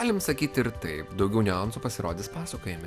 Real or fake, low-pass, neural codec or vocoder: real; 14.4 kHz; none